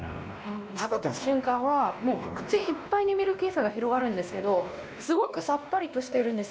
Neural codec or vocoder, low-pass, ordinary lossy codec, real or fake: codec, 16 kHz, 1 kbps, X-Codec, WavLM features, trained on Multilingual LibriSpeech; none; none; fake